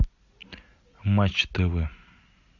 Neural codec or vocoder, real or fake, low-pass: none; real; 7.2 kHz